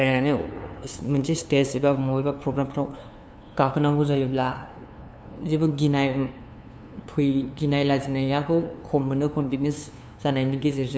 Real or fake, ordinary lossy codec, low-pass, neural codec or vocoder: fake; none; none; codec, 16 kHz, 2 kbps, FunCodec, trained on LibriTTS, 25 frames a second